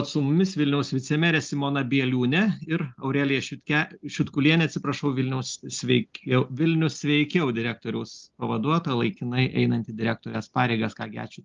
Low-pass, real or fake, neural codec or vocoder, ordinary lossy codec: 7.2 kHz; real; none; Opus, 32 kbps